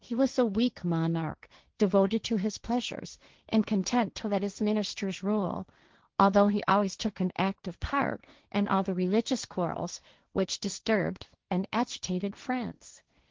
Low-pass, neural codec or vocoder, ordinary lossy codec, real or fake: 7.2 kHz; codec, 16 kHz, 1.1 kbps, Voila-Tokenizer; Opus, 16 kbps; fake